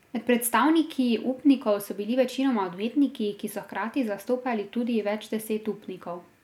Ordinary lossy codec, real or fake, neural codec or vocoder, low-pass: none; real; none; 19.8 kHz